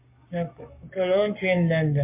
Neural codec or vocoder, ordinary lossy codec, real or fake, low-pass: codec, 44.1 kHz, 7.8 kbps, Pupu-Codec; MP3, 32 kbps; fake; 3.6 kHz